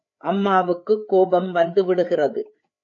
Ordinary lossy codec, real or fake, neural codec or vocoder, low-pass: AAC, 48 kbps; fake; codec, 16 kHz, 8 kbps, FreqCodec, larger model; 7.2 kHz